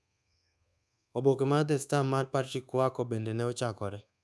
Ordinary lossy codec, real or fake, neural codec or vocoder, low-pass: none; fake; codec, 24 kHz, 1.2 kbps, DualCodec; none